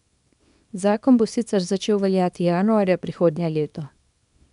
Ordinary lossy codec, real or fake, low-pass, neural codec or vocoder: none; fake; 10.8 kHz; codec, 24 kHz, 0.9 kbps, WavTokenizer, small release